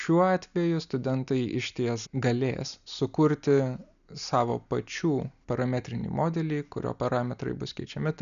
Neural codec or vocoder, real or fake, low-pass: none; real; 7.2 kHz